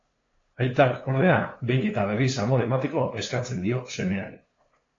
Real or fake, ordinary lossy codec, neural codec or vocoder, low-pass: fake; AAC, 32 kbps; codec, 16 kHz, 2 kbps, FunCodec, trained on LibriTTS, 25 frames a second; 7.2 kHz